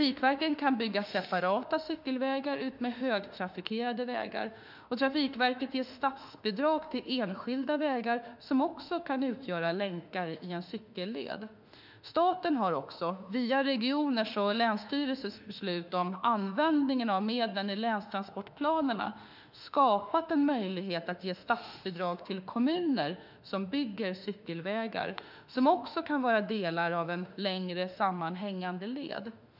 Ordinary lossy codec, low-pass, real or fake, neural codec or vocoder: none; 5.4 kHz; fake; autoencoder, 48 kHz, 32 numbers a frame, DAC-VAE, trained on Japanese speech